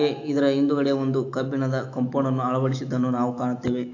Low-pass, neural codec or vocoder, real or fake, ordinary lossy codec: 7.2 kHz; autoencoder, 48 kHz, 128 numbers a frame, DAC-VAE, trained on Japanese speech; fake; AAC, 48 kbps